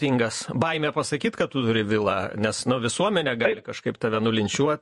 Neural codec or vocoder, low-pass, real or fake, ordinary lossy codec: vocoder, 48 kHz, 128 mel bands, Vocos; 14.4 kHz; fake; MP3, 48 kbps